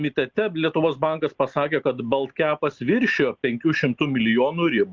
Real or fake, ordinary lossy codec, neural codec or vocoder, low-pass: real; Opus, 24 kbps; none; 7.2 kHz